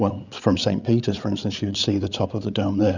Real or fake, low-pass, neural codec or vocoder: fake; 7.2 kHz; codec, 16 kHz, 8 kbps, FreqCodec, larger model